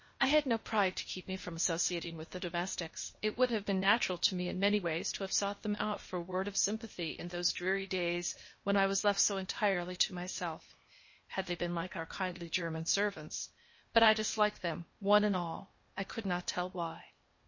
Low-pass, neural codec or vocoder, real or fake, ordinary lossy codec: 7.2 kHz; codec, 16 kHz, 0.8 kbps, ZipCodec; fake; MP3, 32 kbps